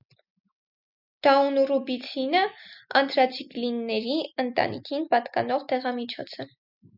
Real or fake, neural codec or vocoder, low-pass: real; none; 5.4 kHz